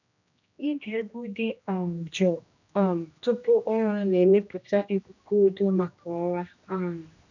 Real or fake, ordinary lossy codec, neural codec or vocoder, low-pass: fake; none; codec, 16 kHz, 1 kbps, X-Codec, HuBERT features, trained on general audio; 7.2 kHz